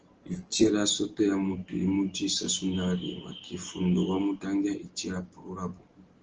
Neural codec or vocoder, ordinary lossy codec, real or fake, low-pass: none; Opus, 16 kbps; real; 7.2 kHz